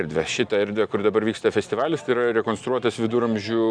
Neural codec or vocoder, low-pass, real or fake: none; 9.9 kHz; real